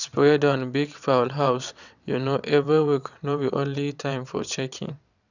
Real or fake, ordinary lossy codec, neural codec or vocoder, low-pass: fake; none; vocoder, 22.05 kHz, 80 mel bands, WaveNeXt; 7.2 kHz